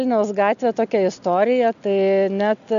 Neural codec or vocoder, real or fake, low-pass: none; real; 7.2 kHz